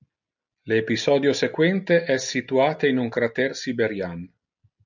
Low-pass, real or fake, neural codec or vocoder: 7.2 kHz; real; none